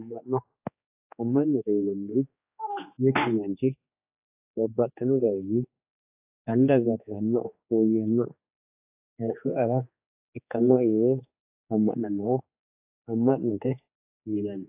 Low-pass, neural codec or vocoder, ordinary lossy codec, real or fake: 3.6 kHz; codec, 16 kHz, 2 kbps, X-Codec, HuBERT features, trained on general audio; AAC, 32 kbps; fake